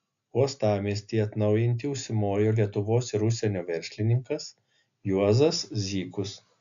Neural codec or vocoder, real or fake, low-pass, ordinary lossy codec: none; real; 7.2 kHz; AAC, 96 kbps